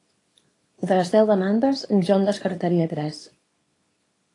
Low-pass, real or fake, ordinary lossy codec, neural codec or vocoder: 10.8 kHz; fake; AAC, 32 kbps; codec, 24 kHz, 0.9 kbps, WavTokenizer, small release